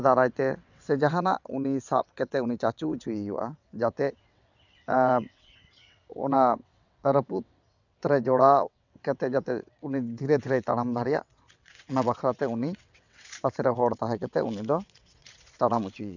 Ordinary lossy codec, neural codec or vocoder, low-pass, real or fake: none; vocoder, 22.05 kHz, 80 mel bands, WaveNeXt; 7.2 kHz; fake